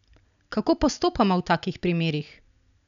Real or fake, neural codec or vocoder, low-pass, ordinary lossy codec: real; none; 7.2 kHz; none